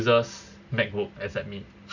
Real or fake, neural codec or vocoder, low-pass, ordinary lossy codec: real; none; 7.2 kHz; none